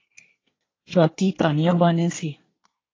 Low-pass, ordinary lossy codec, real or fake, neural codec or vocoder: 7.2 kHz; AAC, 32 kbps; fake; codec, 24 kHz, 1 kbps, SNAC